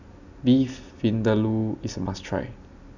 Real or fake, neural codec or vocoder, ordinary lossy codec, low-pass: real; none; none; 7.2 kHz